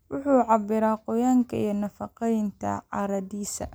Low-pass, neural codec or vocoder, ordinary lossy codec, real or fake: none; none; none; real